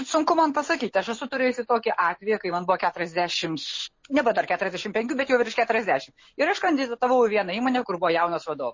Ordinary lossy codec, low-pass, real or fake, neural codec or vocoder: MP3, 32 kbps; 7.2 kHz; real; none